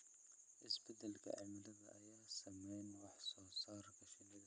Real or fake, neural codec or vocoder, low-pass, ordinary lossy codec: real; none; none; none